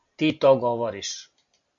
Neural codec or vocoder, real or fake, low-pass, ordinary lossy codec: none; real; 7.2 kHz; MP3, 48 kbps